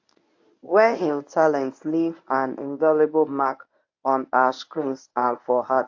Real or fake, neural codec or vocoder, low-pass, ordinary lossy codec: fake; codec, 24 kHz, 0.9 kbps, WavTokenizer, medium speech release version 1; 7.2 kHz; AAC, 48 kbps